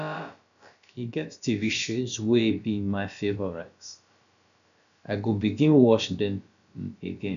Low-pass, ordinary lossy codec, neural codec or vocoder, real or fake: 7.2 kHz; none; codec, 16 kHz, about 1 kbps, DyCAST, with the encoder's durations; fake